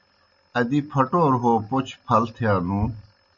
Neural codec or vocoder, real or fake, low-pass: none; real; 7.2 kHz